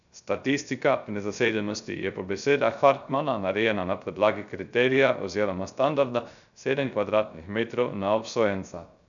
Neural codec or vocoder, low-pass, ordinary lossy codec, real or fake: codec, 16 kHz, 0.3 kbps, FocalCodec; 7.2 kHz; none; fake